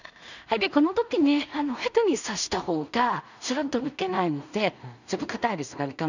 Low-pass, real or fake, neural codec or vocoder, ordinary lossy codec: 7.2 kHz; fake; codec, 16 kHz in and 24 kHz out, 0.4 kbps, LongCat-Audio-Codec, two codebook decoder; none